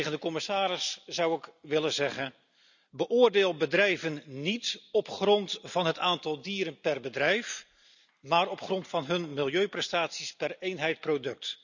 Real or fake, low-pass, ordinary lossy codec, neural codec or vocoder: real; 7.2 kHz; none; none